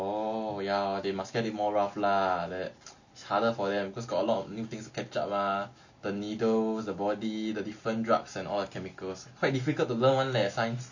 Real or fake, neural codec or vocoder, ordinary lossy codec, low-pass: real; none; none; 7.2 kHz